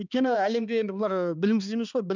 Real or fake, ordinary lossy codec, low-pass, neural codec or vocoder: fake; none; 7.2 kHz; codec, 16 kHz, 2 kbps, X-Codec, HuBERT features, trained on balanced general audio